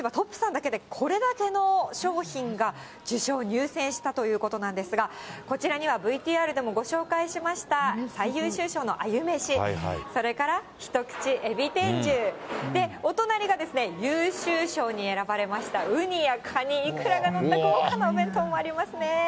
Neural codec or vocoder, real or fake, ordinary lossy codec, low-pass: none; real; none; none